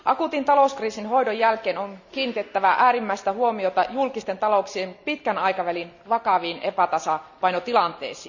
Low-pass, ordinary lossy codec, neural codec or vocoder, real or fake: 7.2 kHz; none; none; real